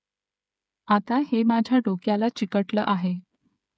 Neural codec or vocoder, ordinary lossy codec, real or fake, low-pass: codec, 16 kHz, 8 kbps, FreqCodec, smaller model; none; fake; none